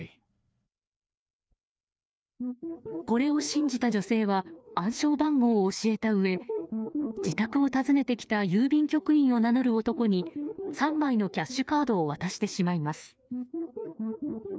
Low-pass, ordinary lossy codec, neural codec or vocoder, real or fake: none; none; codec, 16 kHz, 2 kbps, FreqCodec, larger model; fake